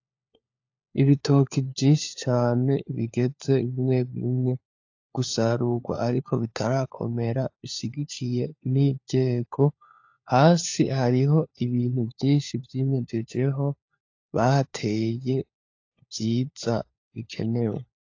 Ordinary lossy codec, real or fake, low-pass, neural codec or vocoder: AAC, 48 kbps; fake; 7.2 kHz; codec, 16 kHz, 4 kbps, FunCodec, trained on LibriTTS, 50 frames a second